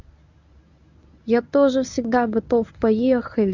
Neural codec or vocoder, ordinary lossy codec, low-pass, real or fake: codec, 24 kHz, 0.9 kbps, WavTokenizer, medium speech release version 1; none; 7.2 kHz; fake